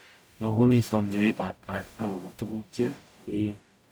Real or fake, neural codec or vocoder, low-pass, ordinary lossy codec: fake; codec, 44.1 kHz, 0.9 kbps, DAC; none; none